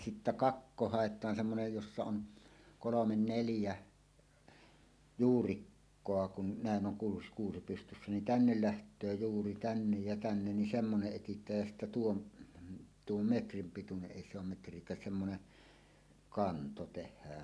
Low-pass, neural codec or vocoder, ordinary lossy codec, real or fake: none; none; none; real